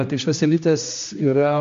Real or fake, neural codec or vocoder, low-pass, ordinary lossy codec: fake; codec, 16 kHz, 1 kbps, X-Codec, HuBERT features, trained on general audio; 7.2 kHz; MP3, 48 kbps